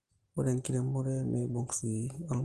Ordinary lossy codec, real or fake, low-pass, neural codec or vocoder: Opus, 16 kbps; real; 10.8 kHz; none